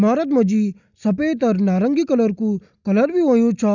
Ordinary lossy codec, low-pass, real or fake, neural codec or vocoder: none; 7.2 kHz; real; none